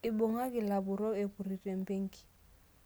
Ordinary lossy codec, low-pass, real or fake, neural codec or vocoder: none; none; real; none